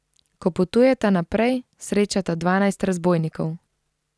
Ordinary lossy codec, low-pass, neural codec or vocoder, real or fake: none; none; none; real